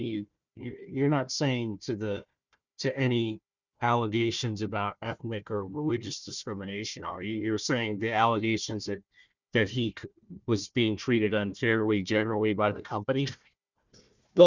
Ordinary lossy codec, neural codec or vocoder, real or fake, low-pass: Opus, 64 kbps; codec, 16 kHz, 1 kbps, FunCodec, trained on Chinese and English, 50 frames a second; fake; 7.2 kHz